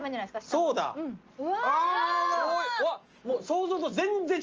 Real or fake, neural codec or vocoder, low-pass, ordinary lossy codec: real; none; 7.2 kHz; Opus, 24 kbps